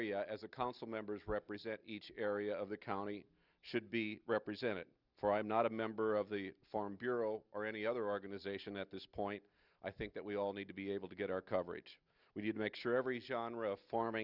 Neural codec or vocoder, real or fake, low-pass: none; real; 5.4 kHz